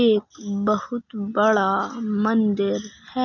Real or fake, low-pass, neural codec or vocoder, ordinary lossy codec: real; 7.2 kHz; none; none